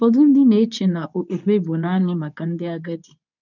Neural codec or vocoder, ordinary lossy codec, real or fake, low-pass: codec, 24 kHz, 0.9 kbps, WavTokenizer, medium speech release version 2; none; fake; 7.2 kHz